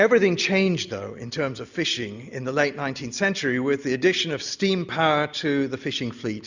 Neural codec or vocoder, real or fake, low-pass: none; real; 7.2 kHz